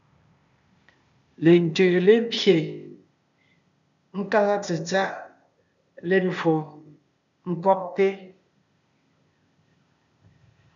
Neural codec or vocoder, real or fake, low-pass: codec, 16 kHz, 0.8 kbps, ZipCodec; fake; 7.2 kHz